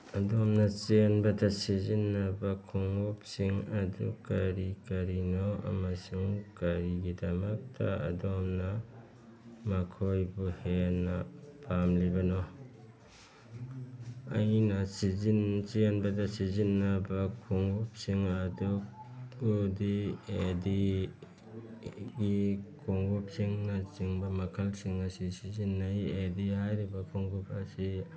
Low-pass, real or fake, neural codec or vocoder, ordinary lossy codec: none; real; none; none